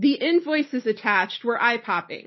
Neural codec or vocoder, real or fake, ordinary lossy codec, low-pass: none; real; MP3, 24 kbps; 7.2 kHz